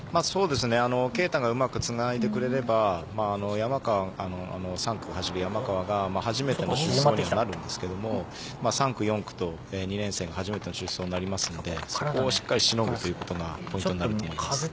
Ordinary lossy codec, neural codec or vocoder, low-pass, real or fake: none; none; none; real